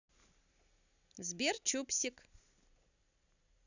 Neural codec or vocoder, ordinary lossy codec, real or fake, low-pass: none; none; real; 7.2 kHz